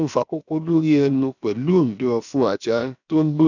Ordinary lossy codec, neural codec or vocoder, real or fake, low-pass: none; codec, 16 kHz, about 1 kbps, DyCAST, with the encoder's durations; fake; 7.2 kHz